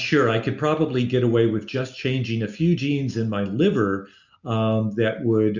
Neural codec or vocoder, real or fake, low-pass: none; real; 7.2 kHz